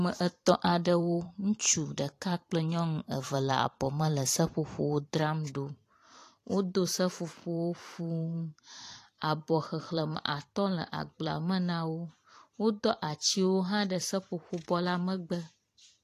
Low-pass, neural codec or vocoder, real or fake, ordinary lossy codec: 14.4 kHz; vocoder, 44.1 kHz, 128 mel bands every 512 samples, BigVGAN v2; fake; AAC, 48 kbps